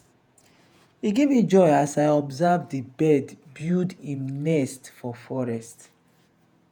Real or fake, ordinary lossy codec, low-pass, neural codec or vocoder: fake; none; none; vocoder, 48 kHz, 128 mel bands, Vocos